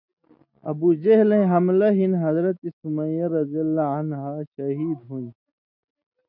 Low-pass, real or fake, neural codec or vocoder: 5.4 kHz; real; none